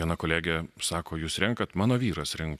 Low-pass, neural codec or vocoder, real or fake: 14.4 kHz; none; real